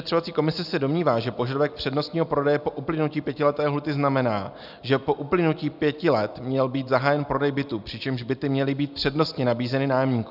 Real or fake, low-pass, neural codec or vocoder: real; 5.4 kHz; none